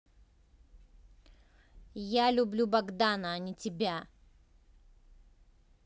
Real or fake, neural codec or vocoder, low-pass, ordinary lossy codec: real; none; none; none